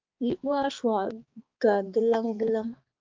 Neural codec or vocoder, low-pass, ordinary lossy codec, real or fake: codec, 16 kHz, 2 kbps, X-Codec, HuBERT features, trained on balanced general audio; 7.2 kHz; Opus, 24 kbps; fake